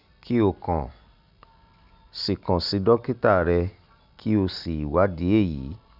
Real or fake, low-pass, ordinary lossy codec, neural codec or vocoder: real; 5.4 kHz; none; none